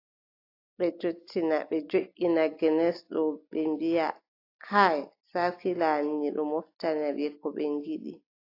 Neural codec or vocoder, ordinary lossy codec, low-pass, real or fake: none; AAC, 32 kbps; 5.4 kHz; real